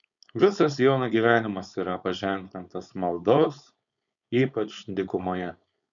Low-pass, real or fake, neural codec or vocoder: 7.2 kHz; fake; codec, 16 kHz, 4.8 kbps, FACodec